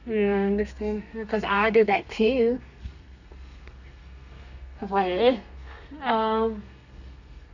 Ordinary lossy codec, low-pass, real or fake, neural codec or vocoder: none; 7.2 kHz; fake; codec, 32 kHz, 1.9 kbps, SNAC